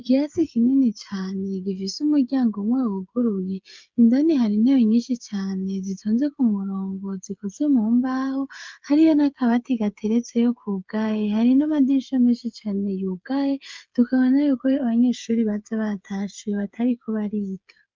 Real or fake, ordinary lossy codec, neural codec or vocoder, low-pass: fake; Opus, 32 kbps; codec, 16 kHz, 8 kbps, FreqCodec, smaller model; 7.2 kHz